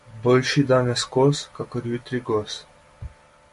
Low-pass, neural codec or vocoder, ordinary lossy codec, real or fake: 14.4 kHz; none; MP3, 48 kbps; real